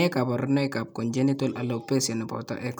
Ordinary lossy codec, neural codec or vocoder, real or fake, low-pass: none; none; real; none